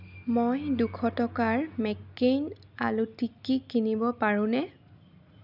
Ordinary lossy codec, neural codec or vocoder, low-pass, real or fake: none; none; 5.4 kHz; real